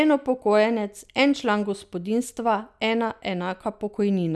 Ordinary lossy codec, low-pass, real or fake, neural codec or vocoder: none; none; real; none